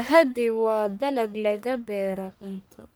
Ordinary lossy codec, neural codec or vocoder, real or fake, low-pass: none; codec, 44.1 kHz, 1.7 kbps, Pupu-Codec; fake; none